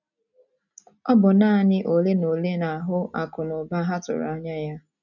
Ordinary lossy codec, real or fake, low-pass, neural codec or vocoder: none; real; 7.2 kHz; none